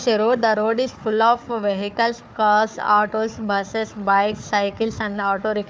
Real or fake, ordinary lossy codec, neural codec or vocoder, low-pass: fake; none; codec, 16 kHz, 4 kbps, FunCodec, trained on Chinese and English, 50 frames a second; none